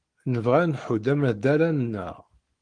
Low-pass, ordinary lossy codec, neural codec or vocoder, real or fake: 9.9 kHz; Opus, 24 kbps; codec, 44.1 kHz, 7.8 kbps, Pupu-Codec; fake